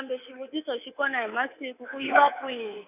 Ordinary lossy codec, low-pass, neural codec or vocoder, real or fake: none; 3.6 kHz; codec, 24 kHz, 3.1 kbps, DualCodec; fake